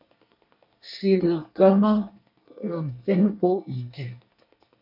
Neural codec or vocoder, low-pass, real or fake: codec, 24 kHz, 1 kbps, SNAC; 5.4 kHz; fake